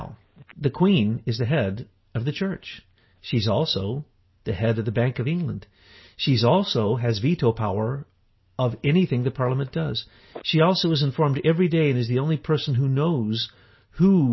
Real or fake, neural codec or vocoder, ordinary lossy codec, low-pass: real; none; MP3, 24 kbps; 7.2 kHz